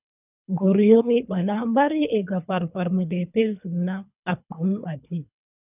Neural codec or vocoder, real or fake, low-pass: codec, 24 kHz, 3 kbps, HILCodec; fake; 3.6 kHz